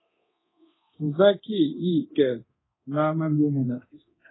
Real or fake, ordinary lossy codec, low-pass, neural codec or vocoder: fake; AAC, 16 kbps; 7.2 kHz; codec, 24 kHz, 0.9 kbps, DualCodec